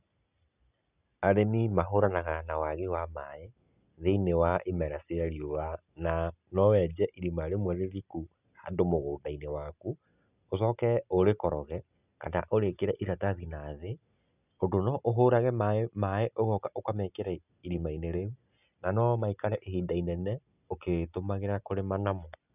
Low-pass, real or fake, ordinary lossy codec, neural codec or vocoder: 3.6 kHz; real; none; none